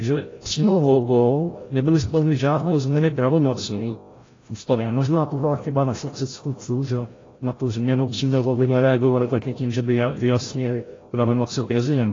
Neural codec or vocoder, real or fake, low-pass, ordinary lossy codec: codec, 16 kHz, 0.5 kbps, FreqCodec, larger model; fake; 7.2 kHz; AAC, 32 kbps